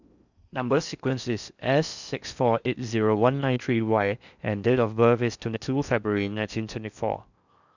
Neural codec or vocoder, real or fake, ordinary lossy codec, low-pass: codec, 16 kHz in and 24 kHz out, 0.6 kbps, FocalCodec, streaming, 4096 codes; fake; none; 7.2 kHz